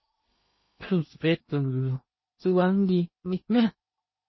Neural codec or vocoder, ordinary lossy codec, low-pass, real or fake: codec, 16 kHz in and 24 kHz out, 0.8 kbps, FocalCodec, streaming, 65536 codes; MP3, 24 kbps; 7.2 kHz; fake